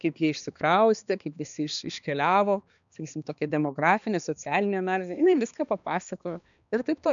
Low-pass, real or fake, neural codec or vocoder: 7.2 kHz; fake; codec, 16 kHz, 2 kbps, X-Codec, HuBERT features, trained on balanced general audio